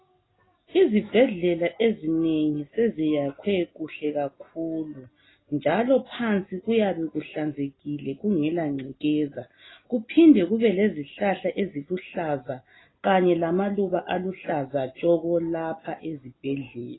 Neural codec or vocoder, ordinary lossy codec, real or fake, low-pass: none; AAC, 16 kbps; real; 7.2 kHz